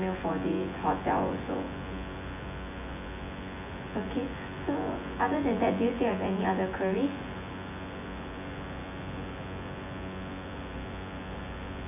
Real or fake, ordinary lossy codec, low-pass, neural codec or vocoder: fake; none; 3.6 kHz; vocoder, 24 kHz, 100 mel bands, Vocos